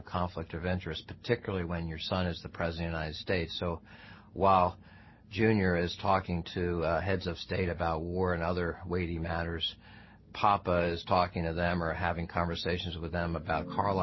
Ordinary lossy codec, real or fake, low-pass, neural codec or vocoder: MP3, 24 kbps; real; 7.2 kHz; none